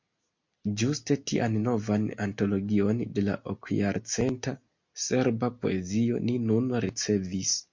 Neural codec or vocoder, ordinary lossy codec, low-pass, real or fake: none; MP3, 48 kbps; 7.2 kHz; real